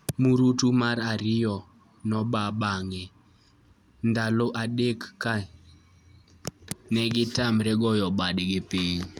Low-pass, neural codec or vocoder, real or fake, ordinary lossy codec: 19.8 kHz; none; real; none